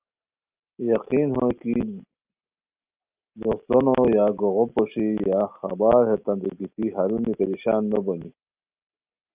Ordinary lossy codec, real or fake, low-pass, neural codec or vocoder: Opus, 24 kbps; real; 3.6 kHz; none